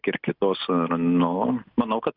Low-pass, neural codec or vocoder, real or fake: 5.4 kHz; none; real